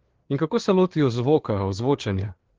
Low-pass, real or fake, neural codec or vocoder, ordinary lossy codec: 7.2 kHz; fake; codec, 16 kHz, 4 kbps, FreqCodec, larger model; Opus, 16 kbps